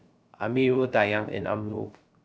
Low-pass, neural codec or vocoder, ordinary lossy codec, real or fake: none; codec, 16 kHz, 0.3 kbps, FocalCodec; none; fake